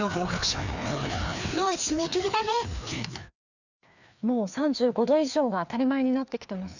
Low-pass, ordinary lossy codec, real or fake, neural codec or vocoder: 7.2 kHz; none; fake; codec, 16 kHz, 2 kbps, FreqCodec, larger model